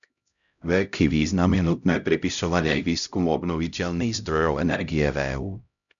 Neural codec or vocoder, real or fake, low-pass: codec, 16 kHz, 0.5 kbps, X-Codec, HuBERT features, trained on LibriSpeech; fake; 7.2 kHz